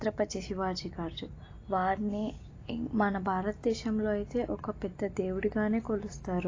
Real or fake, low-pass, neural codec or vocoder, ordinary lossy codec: real; 7.2 kHz; none; AAC, 32 kbps